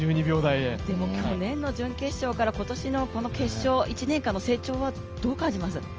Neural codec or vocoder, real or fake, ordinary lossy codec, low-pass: none; real; Opus, 24 kbps; 7.2 kHz